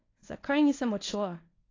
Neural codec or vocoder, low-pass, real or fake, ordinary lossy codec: codec, 24 kHz, 0.9 kbps, WavTokenizer, medium speech release version 1; 7.2 kHz; fake; AAC, 32 kbps